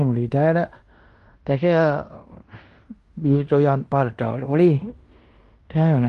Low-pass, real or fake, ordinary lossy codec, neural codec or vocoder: 10.8 kHz; fake; Opus, 24 kbps; codec, 16 kHz in and 24 kHz out, 0.9 kbps, LongCat-Audio-Codec, fine tuned four codebook decoder